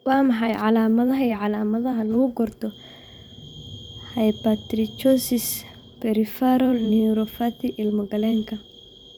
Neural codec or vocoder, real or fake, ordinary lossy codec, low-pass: vocoder, 44.1 kHz, 128 mel bands every 512 samples, BigVGAN v2; fake; none; none